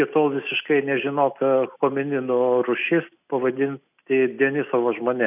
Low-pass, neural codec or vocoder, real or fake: 3.6 kHz; none; real